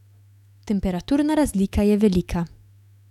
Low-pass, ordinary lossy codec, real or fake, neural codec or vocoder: 19.8 kHz; none; fake; autoencoder, 48 kHz, 128 numbers a frame, DAC-VAE, trained on Japanese speech